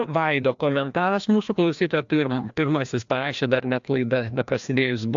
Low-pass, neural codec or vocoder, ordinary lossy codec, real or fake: 7.2 kHz; codec, 16 kHz, 1 kbps, FreqCodec, larger model; AAC, 64 kbps; fake